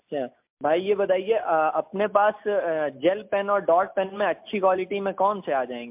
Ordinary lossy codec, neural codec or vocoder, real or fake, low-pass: MP3, 32 kbps; none; real; 3.6 kHz